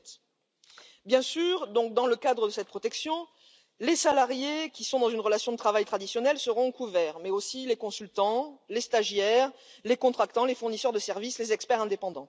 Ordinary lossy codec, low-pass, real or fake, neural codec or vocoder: none; none; real; none